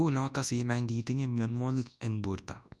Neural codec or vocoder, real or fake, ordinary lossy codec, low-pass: codec, 24 kHz, 0.9 kbps, WavTokenizer, large speech release; fake; none; none